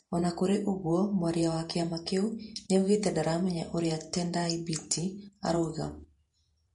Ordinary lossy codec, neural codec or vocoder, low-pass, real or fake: MP3, 48 kbps; vocoder, 48 kHz, 128 mel bands, Vocos; 19.8 kHz; fake